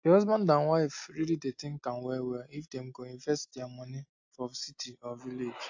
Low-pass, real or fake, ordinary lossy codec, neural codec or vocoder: 7.2 kHz; real; AAC, 48 kbps; none